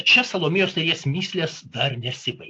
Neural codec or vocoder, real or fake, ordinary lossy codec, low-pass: none; real; AAC, 48 kbps; 10.8 kHz